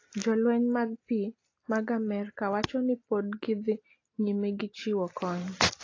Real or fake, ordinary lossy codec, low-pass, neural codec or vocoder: real; AAC, 32 kbps; 7.2 kHz; none